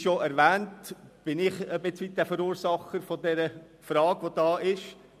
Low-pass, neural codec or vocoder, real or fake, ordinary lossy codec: 14.4 kHz; none; real; MP3, 96 kbps